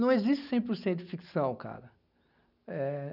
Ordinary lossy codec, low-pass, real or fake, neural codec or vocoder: none; 5.4 kHz; real; none